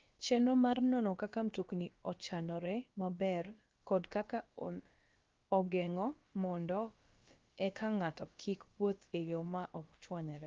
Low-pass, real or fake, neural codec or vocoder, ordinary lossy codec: 7.2 kHz; fake; codec, 16 kHz, about 1 kbps, DyCAST, with the encoder's durations; Opus, 32 kbps